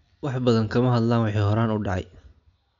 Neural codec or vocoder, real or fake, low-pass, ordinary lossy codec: none; real; 7.2 kHz; none